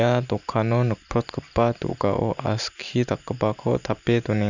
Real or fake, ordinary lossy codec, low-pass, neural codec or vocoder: fake; none; 7.2 kHz; vocoder, 44.1 kHz, 128 mel bands every 512 samples, BigVGAN v2